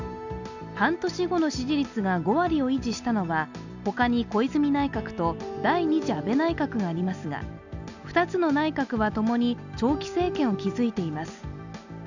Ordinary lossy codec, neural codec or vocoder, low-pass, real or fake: none; none; 7.2 kHz; real